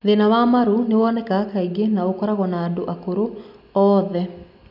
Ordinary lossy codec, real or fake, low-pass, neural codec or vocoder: none; real; 5.4 kHz; none